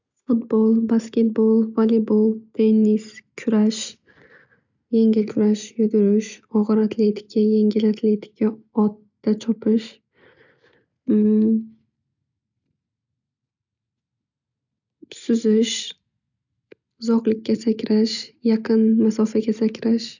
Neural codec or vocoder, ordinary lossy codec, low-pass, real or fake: none; none; 7.2 kHz; real